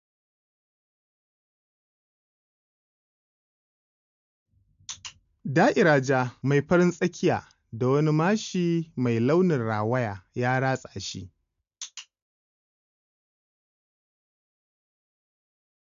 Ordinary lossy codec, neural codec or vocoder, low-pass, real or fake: MP3, 64 kbps; none; 7.2 kHz; real